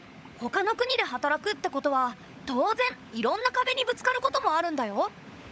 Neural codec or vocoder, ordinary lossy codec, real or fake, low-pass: codec, 16 kHz, 16 kbps, FunCodec, trained on LibriTTS, 50 frames a second; none; fake; none